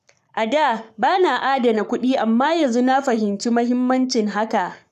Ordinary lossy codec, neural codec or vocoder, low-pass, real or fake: none; codec, 44.1 kHz, 7.8 kbps, Pupu-Codec; 14.4 kHz; fake